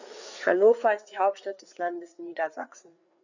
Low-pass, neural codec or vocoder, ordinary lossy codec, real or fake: 7.2 kHz; codec, 16 kHz, 4 kbps, FreqCodec, larger model; none; fake